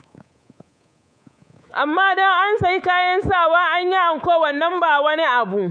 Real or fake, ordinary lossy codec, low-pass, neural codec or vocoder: fake; none; 9.9 kHz; codec, 24 kHz, 3.1 kbps, DualCodec